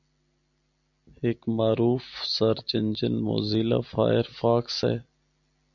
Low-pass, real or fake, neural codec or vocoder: 7.2 kHz; real; none